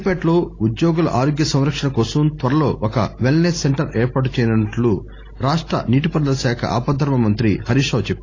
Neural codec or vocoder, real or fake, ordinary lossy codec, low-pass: none; real; AAC, 32 kbps; 7.2 kHz